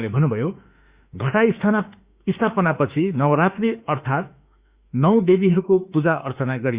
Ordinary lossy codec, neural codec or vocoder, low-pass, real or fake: Opus, 64 kbps; autoencoder, 48 kHz, 32 numbers a frame, DAC-VAE, trained on Japanese speech; 3.6 kHz; fake